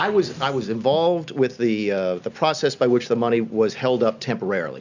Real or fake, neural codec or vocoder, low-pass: real; none; 7.2 kHz